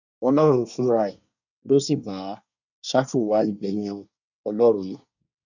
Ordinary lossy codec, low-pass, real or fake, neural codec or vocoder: none; 7.2 kHz; fake; codec, 24 kHz, 1 kbps, SNAC